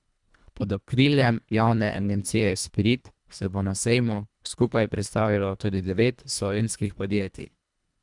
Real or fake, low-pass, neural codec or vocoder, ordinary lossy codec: fake; 10.8 kHz; codec, 24 kHz, 1.5 kbps, HILCodec; none